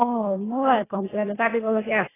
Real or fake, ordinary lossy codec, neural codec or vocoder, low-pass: fake; AAC, 16 kbps; codec, 24 kHz, 1.5 kbps, HILCodec; 3.6 kHz